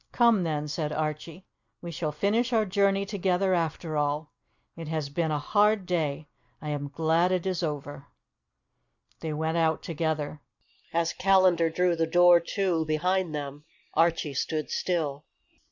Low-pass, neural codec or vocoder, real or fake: 7.2 kHz; none; real